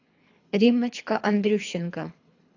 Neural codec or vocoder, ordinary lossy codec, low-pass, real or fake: codec, 24 kHz, 3 kbps, HILCodec; AAC, 48 kbps; 7.2 kHz; fake